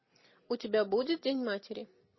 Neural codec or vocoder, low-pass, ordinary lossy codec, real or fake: none; 7.2 kHz; MP3, 24 kbps; real